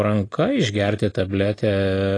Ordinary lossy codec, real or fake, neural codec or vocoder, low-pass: AAC, 32 kbps; real; none; 9.9 kHz